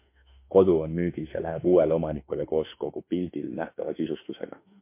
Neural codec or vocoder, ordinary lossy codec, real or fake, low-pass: autoencoder, 48 kHz, 32 numbers a frame, DAC-VAE, trained on Japanese speech; MP3, 24 kbps; fake; 3.6 kHz